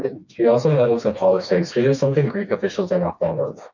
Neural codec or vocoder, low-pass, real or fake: codec, 16 kHz, 2 kbps, FreqCodec, smaller model; 7.2 kHz; fake